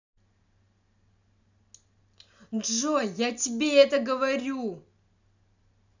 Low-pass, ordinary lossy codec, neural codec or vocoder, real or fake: 7.2 kHz; none; none; real